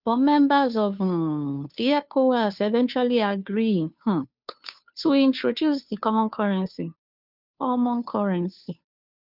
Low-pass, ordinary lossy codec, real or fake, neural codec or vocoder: 5.4 kHz; none; fake; codec, 16 kHz, 2 kbps, FunCodec, trained on Chinese and English, 25 frames a second